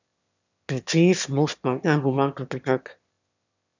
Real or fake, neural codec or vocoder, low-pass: fake; autoencoder, 22.05 kHz, a latent of 192 numbers a frame, VITS, trained on one speaker; 7.2 kHz